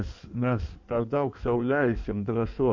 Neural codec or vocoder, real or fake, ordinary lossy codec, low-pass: codec, 16 kHz in and 24 kHz out, 1.1 kbps, FireRedTTS-2 codec; fake; MP3, 64 kbps; 7.2 kHz